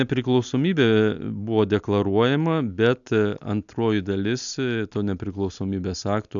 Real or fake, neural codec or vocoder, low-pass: real; none; 7.2 kHz